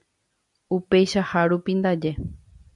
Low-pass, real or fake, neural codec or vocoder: 10.8 kHz; real; none